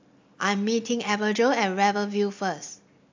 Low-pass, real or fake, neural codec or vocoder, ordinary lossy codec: 7.2 kHz; real; none; MP3, 64 kbps